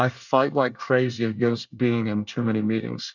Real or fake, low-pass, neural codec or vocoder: fake; 7.2 kHz; codec, 24 kHz, 1 kbps, SNAC